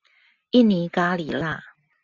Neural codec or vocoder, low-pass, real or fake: none; 7.2 kHz; real